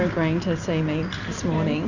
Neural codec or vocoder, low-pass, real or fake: none; 7.2 kHz; real